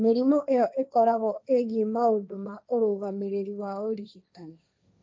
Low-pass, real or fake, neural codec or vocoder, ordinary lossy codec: none; fake; codec, 16 kHz, 1.1 kbps, Voila-Tokenizer; none